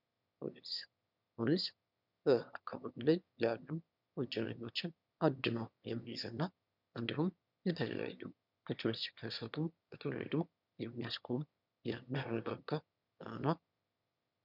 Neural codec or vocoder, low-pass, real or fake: autoencoder, 22.05 kHz, a latent of 192 numbers a frame, VITS, trained on one speaker; 5.4 kHz; fake